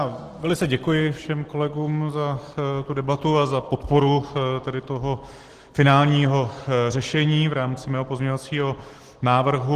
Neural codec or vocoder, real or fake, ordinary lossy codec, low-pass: none; real; Opus, 16 kbps; 14.4 kHz